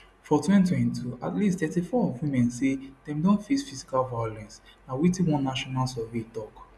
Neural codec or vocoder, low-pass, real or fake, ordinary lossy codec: none; none; real; none